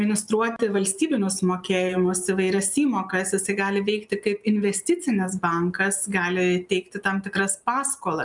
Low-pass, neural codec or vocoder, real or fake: 10.8 kHz; none; real